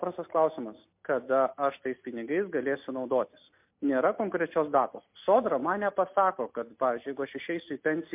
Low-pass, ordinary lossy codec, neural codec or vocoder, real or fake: 3.6 kHz; MP3, 32 kbps; none; real